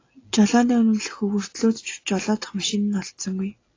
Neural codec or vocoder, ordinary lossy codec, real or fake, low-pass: none; AAC, 32 kbps; real; 7.2 kHz